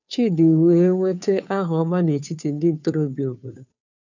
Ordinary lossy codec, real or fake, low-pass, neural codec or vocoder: none; fake; 7.2 kHz; codec, 16 kHz, 2 kbps, FunCodec, trained on Chinese and English, 25 frames a second